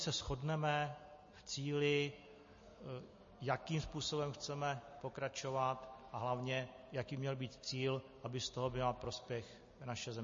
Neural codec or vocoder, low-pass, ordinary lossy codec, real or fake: none; 7.2 kHz; MP3, 32 kbps; real